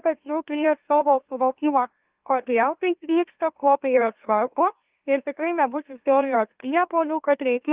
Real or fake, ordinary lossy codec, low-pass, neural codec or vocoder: fake; Opus, 32 kbps; 3.6 kHz; autoencoder, 44.1 kHz, a latent of 192 numbers a frame, MeloTTS